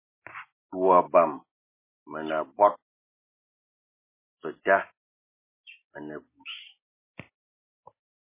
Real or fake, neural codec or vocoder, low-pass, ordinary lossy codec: real; none; 3.6 kHz; MP3, 16 kbps